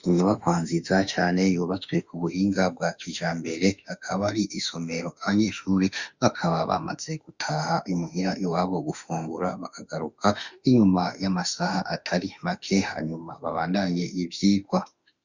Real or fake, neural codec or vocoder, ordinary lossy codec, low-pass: fake; autoencoder, 48 kHz, 32 numbers a frame, DAC-VAE, trained on Japanese speech; Opus, 64 kbps; 7.2 kHz